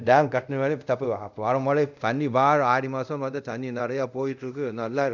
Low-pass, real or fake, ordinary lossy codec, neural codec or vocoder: 7.2 kHz; fake; none; codec, 24 kHz, 0.5 kbps, DualCodec